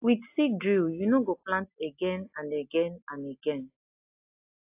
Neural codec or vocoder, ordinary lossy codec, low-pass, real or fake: none; AAC, 32 kbps; 3.6 kHz; real